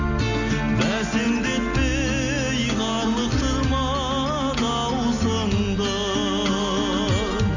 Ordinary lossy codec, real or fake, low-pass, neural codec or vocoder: none; real; 7.2 kHz; none